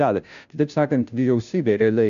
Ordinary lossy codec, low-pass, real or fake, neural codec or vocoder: AAC, 96 kbps; 7.2 kHz; fake; codec, 16 kHz, 0.5 kbps, FunCodec, trained on Chinese and English, 25 frames a second